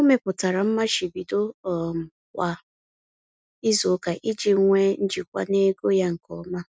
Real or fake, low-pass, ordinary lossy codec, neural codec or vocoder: real; none; none; none